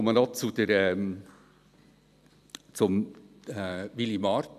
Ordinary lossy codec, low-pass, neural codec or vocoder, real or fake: none; 14.4 kHz; none; real